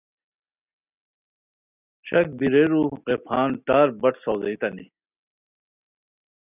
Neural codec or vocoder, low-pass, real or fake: none; 3.6 kHz; real